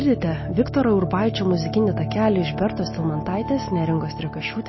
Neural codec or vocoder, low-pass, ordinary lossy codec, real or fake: none; 7.2 kHz; MP3, 24 kbps; real